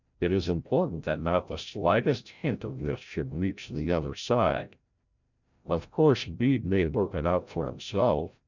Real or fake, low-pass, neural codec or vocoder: fake; 7.2 kHz; codec, 16 kHz, 0.5 kbps, FreqCodec, larger model